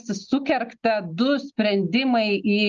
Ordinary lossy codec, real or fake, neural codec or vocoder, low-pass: Opus, 24 kbps; real; none; 7.2 kHz